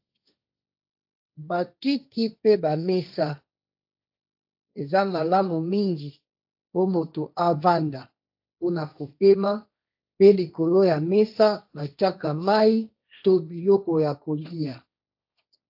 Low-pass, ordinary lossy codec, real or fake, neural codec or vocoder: 5.4 kHz; AAC, 48 kbps; fake; codec, 16 kHz, 1.1 kbps, Voila-Tokenizer